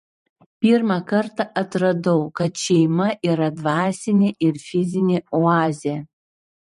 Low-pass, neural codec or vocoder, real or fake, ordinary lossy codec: 14.4 kHz; vocoder, 44.1 kHz, 128 mel bands every 512 samples, BigVGAN v2; fake; MP3, 48 kbps